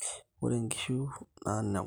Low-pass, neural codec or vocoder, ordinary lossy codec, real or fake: none; none; none; real